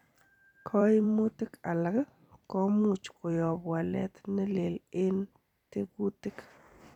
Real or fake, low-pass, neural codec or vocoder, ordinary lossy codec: fake; 19.8 kHz; vocoder, 44.1 kHz, 128 mel bands every 256 samples, BigVGAN v2; none